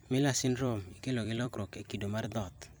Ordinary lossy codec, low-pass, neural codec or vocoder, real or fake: none; none; vocoder, 44.1 kHz, 128 mel bands every 256 samples, BigVGAN v2; fake